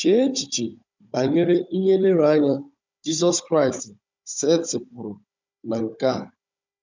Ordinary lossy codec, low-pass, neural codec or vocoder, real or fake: MP3, 64 kbps; 7.2 kHz; codec, 16 kHz, 16 kbps, FunCodec, trained on Chinese and English, 50 frames a second; fake